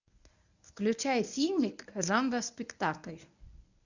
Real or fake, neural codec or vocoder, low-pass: fake; codec, 24 kHz, 0.9 kbps, WavTokenizer, medium speech release version 1; 7.2 kHz